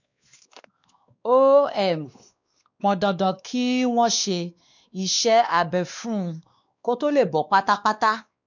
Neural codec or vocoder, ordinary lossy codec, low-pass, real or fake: codec, 16 kHz, 2 kbps, X-Codec, WavLM features, trained on Multilingual LibriSpeech; none; 7.2 kHz; fake